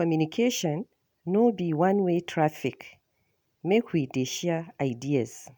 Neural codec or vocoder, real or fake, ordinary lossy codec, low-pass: none; real; none; none